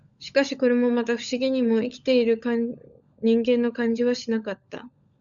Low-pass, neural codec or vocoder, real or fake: 7.2 kHz; codec, 16 kHz, 16 kbps, FunCodec, trained on LibriTTS, 50 frames a second; fake